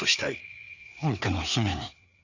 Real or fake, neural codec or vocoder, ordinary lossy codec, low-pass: fake; codec, 16 kHz, 4 kbps, FreqCodec, larger model; none; 7.2 kHz